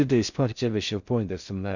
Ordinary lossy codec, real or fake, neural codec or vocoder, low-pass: none; fake; codec, 16 kHz in and 24 kHz out, 0.6 kbps, FocalCodec, streaming, 4096 codes; 7.2 kHz